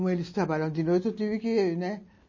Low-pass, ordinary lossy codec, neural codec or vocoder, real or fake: 7.2 kHz; MP3, 32 kbps; none; real